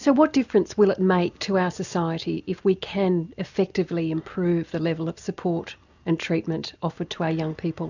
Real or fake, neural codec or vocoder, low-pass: real; none; 7.2 kHz